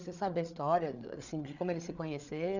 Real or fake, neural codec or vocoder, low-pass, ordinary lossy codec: fake; codec, 16 kHz, 8 kbps, FreqCodec, larger model; 7.2 kHz; none